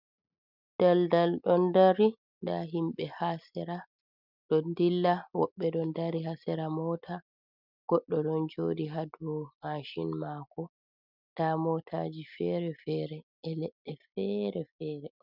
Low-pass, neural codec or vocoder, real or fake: 5.4 kHz; none; real